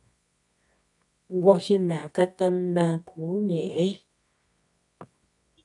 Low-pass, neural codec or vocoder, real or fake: 10.8 kHz; codec, 24 kHz, 0.9 kbps, WavTokenizer, medium music audio release; fake